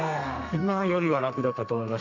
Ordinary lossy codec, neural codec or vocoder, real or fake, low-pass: none; codec, 32 kHz, 1.9 kbps, SNAC; fake; 7.2 kHz